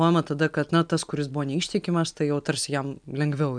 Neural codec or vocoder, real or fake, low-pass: none; real; 9.9 kHz